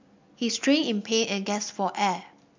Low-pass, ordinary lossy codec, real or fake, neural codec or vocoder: 7.2 kHz; MP3, 64 kbps; real; none